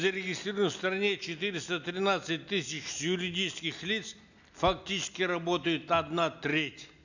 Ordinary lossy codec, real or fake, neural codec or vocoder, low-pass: none; real; none; 7.2 kHz